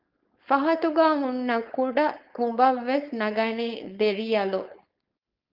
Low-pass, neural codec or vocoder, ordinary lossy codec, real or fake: 5.4 kHz; codec, 16 kHz, 4.8 kbps, FACodec; Opus, 24 kbps; fake